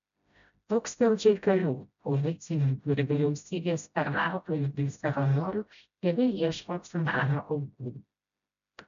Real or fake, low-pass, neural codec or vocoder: fake; 7.2 kHz; codec, 16 kHz, 0.5 kbps, FreqCodec, smaller model